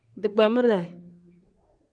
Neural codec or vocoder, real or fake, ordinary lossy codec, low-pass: codec, 24 kHz, 1 kbps, SNAC; fake; AAC, 64 kbps; 9.9 kHz